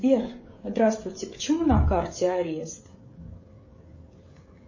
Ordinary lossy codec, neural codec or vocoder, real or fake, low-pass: MP3, 32 kbps; codec, 16 kHz, 16 kbps, FreqCodec, smaller model; fake; 7.2 kHz